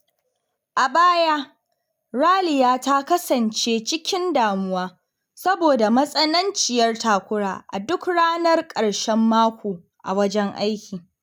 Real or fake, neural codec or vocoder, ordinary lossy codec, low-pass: real; none; none; none